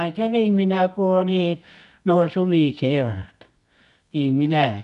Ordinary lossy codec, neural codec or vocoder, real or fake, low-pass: none; codec, 24 kHz, 0.9 kbps, WavTokenizer, medium music audio release; fake; 10.8 kHz